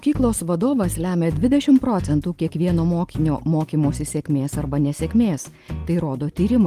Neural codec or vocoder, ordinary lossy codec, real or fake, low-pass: none; Opus, 24 kbps; real; 14.4 kHz